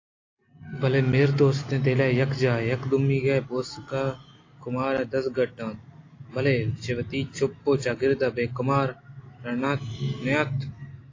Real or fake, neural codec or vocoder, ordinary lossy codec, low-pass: real; none; AAC, 32 kbps; 7.2 kHz